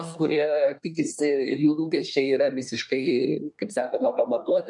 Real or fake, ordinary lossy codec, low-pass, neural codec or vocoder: fake; MP3, 64 kbps; 10.8 kHz; codec, 24 kHz, 1 kbps, SNAC